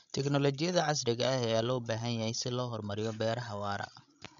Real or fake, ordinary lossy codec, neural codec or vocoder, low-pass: fake; none; codec, 16 kHz, 16 kbps, FreqCodec, larger model; 7.2 kHz